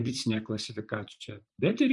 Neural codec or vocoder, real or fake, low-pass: vocoder, 44.1 kHz, 128 mel bands, Pupu-Vocoder; fake; 10.8 kHz